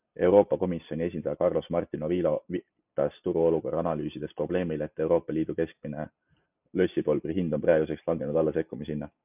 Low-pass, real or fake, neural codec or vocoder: 3.6 kHz; real; none